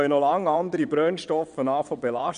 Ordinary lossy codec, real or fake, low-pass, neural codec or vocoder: none; fake; 9.9 kHz; vocoder, 22.05 kHz, 80 mel bands, WaveNeXt